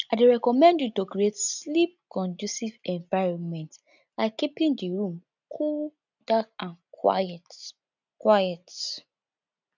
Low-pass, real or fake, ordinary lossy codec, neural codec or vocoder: 7.2 kHz; real; none; none